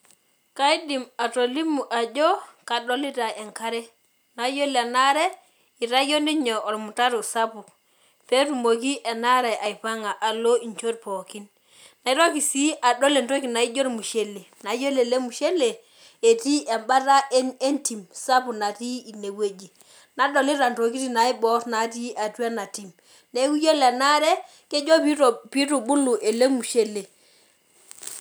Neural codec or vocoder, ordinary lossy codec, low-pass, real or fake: none; none; none; real